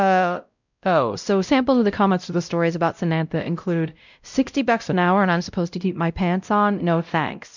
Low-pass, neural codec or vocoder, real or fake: 7.2 kHz; codec, 16 kHz, 0.5 kbps, X-Codec, WavLM features, trained on Multilingual LibriSpeech; fake